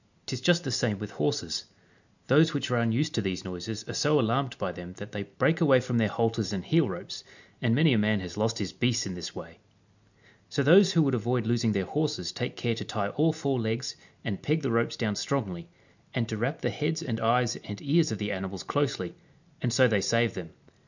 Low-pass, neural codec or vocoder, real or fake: 7.2 kHz; none; real